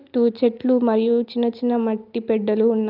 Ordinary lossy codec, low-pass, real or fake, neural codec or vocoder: Opus, 24 kbps; 5.4 kHz; real; none